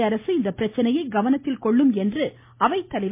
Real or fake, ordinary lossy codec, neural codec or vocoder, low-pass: real; MP3, 24 kbps; none; 3.6 kHz